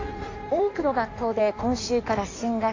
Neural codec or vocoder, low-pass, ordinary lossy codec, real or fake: codec, 16 kHz in and 24 kHz out, 1.1 kbps, FireRedTTS-2 codec; 7.2 kHz; AAC, 48 kbps; fake